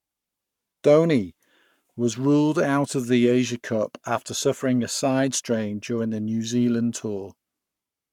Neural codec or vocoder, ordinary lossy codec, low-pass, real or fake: codec, 44.1 kHz, 7.8 kbps, Pupu-Codec; none; 19.8 kHz; fake